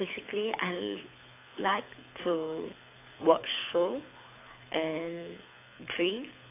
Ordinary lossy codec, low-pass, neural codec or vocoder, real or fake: AAC, 24 kbps; 3.6 kHz; codec, 24 kHz, 6 kbps, HILCodec; fake